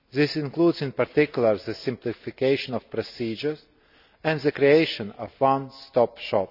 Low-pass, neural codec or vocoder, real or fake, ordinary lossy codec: 5.4 kHz; none; real; none